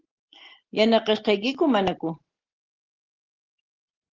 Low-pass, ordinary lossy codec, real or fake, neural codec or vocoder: 7.2 kHz; Opus, 16 kbps; real; none